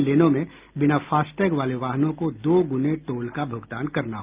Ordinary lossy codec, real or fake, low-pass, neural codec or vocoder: Opus, 32 kbps; real; 3.6 kHz; none